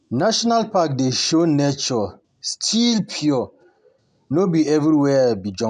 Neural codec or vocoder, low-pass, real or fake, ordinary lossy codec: none; 9.9 kHz; real; none